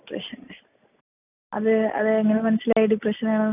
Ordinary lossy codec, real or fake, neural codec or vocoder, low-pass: none; real; none; 3.6 kHz